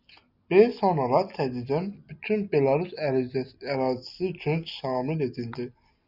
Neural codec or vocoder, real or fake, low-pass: none; real; 5.4 kHz